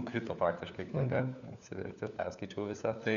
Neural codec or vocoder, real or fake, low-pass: codec, 16 kHz, 4 kbps, FunCodec, trained on LibriTTS, 50 frames a second; fake; 7.2 kHz